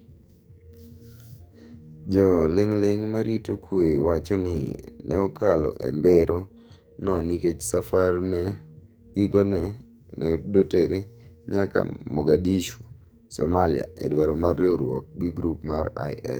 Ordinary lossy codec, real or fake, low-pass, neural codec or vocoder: none; fake; none; codec, 44.1 kHz, 2.6 kbps, SNAC